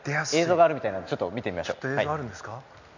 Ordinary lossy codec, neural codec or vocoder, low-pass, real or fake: none; none; 7.2 kHz; real